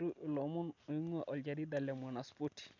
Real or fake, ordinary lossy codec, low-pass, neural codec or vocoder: real; none; 7.2 kHz; none